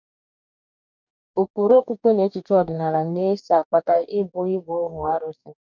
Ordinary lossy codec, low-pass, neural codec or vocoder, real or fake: none; 7.2 kHz; codec, 44.1 kHz, 2.6 kbps, DAC; fake